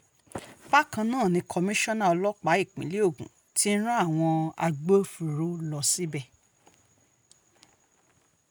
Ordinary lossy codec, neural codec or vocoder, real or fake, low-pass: none; none; real; none